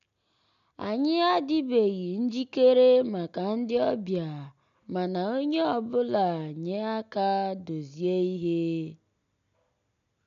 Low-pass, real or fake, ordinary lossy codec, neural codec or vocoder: 7.2 kHz; real; none; none